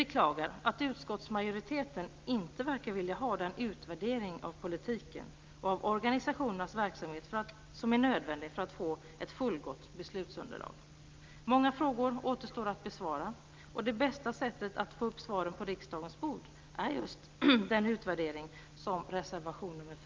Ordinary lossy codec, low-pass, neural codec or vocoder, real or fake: Opus, 24 kbps; 7.2 kHz; none; real